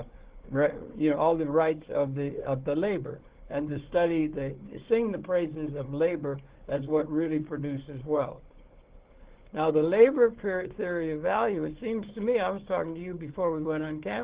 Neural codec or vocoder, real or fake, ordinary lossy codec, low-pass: codec, 16 kHz, 4 kbps, FunCodec, trained on Chinese and English, 50 frames a second; fake; Opus, 16 kbps; 3.6 kHz